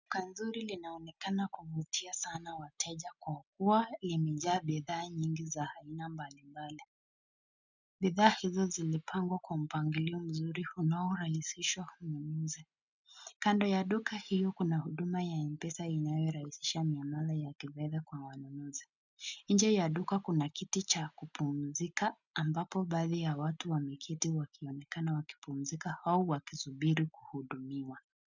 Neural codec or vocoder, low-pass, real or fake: none; 7.2 kHz; real